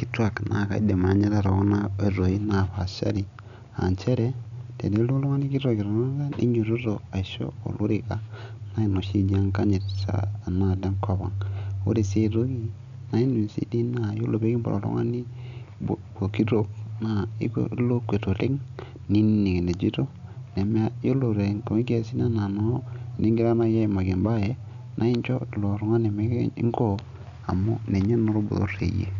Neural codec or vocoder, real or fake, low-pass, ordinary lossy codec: none; real; 7.2 kHz; MP3, 96 kbps